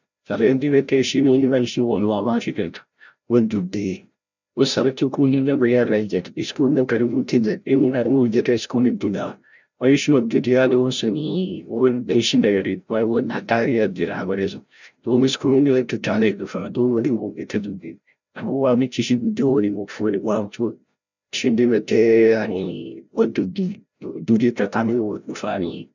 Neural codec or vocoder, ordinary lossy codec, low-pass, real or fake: codec, 16 kHz, 0.5 kbps, FreqCodec, larger model; none; 7.2 kHz; fake